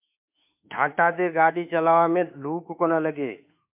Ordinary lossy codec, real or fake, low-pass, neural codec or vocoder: MP3, 32 kbps; fake; 3.6 kHz; autoencoder, 48 kHz, 32 numbers a frame, DAC-VAE, trained on Japanese speech